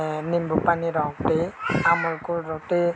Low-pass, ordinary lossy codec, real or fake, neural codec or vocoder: none; none; real; none